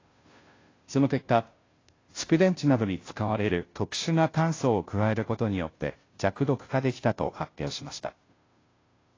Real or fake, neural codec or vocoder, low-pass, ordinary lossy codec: fake; codec, 16 kHz, 0.5 kbps, FunCodec, trained on Chinese and English, 25 frames a second; 7.2 kHz; AAC, 32 kbps